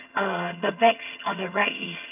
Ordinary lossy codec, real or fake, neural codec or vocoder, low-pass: none; fake; vocoder, 22.05 kHz, 80 mel bands, HiFi-GAN; 3.6 kHz